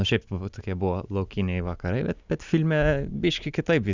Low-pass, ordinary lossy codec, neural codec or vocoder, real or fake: 7.2 kHz; Opus, 64 kbps; none; real